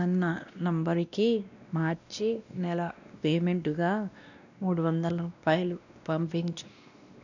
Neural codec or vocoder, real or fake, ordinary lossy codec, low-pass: codec, 16 kHz, 1 kbps, X-Codec, WavLM features, trained on Multilingual LibriSpeech; fake; none; 7.2 kHz